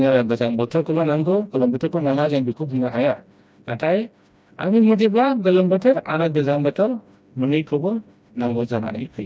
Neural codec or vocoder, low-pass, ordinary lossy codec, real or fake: codec, 16 kHz, 1 kbps, FreqCodec, smaller model; none; none; fake